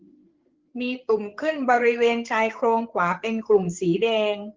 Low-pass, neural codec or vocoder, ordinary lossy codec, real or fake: 7.2 kHz; codec, 16 kHz, 8 kbps, FreqCodec, larger model; Opus, 16 kbps; fake